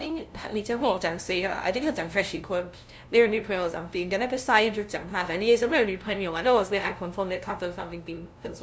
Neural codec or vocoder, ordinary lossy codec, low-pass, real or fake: codec, 16 kHz, 0.5 kbps, FunCodec, trained on LibriTTS, 25 frames a second; none; none; fake